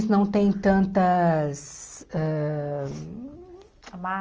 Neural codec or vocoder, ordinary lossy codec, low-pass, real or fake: none; Opus, 24 kbps; 7.2 kHz; real